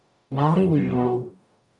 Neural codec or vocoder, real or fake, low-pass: codec, 44.1 kHz, 0.9 kbps, DAC; fake; 10.8 kHz